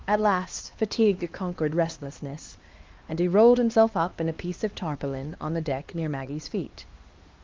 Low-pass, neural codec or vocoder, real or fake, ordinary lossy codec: 7.2 kHz; codec, 16 kHz, 2 kbps, X-Codec, HuBERT features, trained on LibriSpeech; fake; Opus, 32 kbps